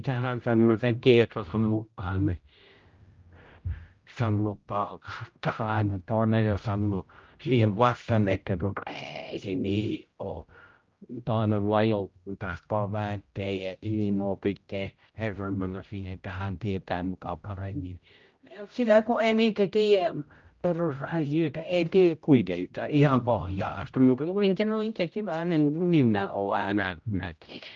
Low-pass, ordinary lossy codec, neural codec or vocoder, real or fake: 7.2 kHz; Opus, 24 kbps; codec, 16 kHz, 0.5 kbps, X-Codec, HuBERT features, trained on general audio; fake